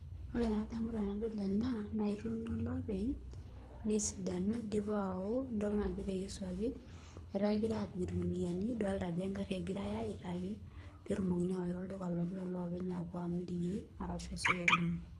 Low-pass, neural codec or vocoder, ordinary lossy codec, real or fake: none; codec, 24 kHz, 3 kbps, HILCodec; none; fake